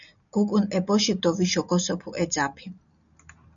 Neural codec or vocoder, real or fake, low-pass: none; real; 7.2 kHz